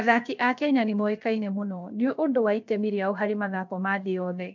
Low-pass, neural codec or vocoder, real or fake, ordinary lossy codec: 7.2 kHz; codec, 16 kHz, 0.7 kbps, FocalCodec; fake; MP3, 64 kbps